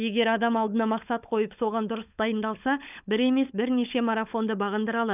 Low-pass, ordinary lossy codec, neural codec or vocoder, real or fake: 3.6 kHz; none; codec, 16 kHz, 16 kbps, FunCodec, trained on LibriTTS, 50 frames a second; fake